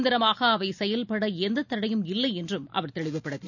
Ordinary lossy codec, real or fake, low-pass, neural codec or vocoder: none; real; 7.2 kHz; none